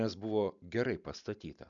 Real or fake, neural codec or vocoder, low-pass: real; none; 7.2 kHz